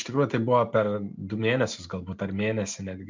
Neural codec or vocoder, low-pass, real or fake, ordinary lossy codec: none; 7.2 kHz; real; MP3, 64 kbps